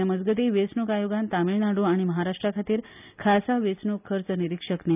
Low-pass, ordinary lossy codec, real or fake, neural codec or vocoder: 3.6 kHz; none; real; none